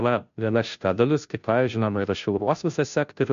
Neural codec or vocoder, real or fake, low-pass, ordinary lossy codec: codec, 16 kHz, 0.5 kbps, FunCodec, trained on Chinese and English, 25 frames a second; fake; 7.2 kHz; MP3, 64 kbps